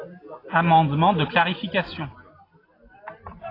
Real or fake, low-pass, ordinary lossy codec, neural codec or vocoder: real; 5.4 kHz; AAC, 24 kbps; none